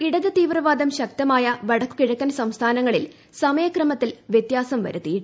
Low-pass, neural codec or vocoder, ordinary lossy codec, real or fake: none; none; none; real